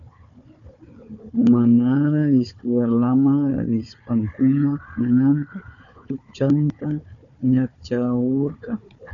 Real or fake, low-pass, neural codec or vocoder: fake; 7.2 kHz; codec, 16 kHz, 4 kbps, FunCodec, trained on Chinese and English, 50 frames a second